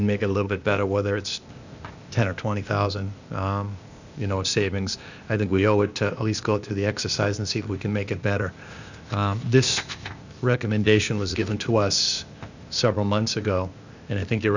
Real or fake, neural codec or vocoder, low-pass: fake; codec, 16 kHz, 0.8 kbps, ZipCodec; 7.2 kHz